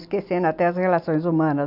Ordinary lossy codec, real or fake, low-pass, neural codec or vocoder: MP3, 48 kbps; real; 5.4 kHz; none